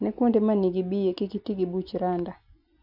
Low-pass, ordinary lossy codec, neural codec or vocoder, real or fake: 5.4 kHz; none; none; real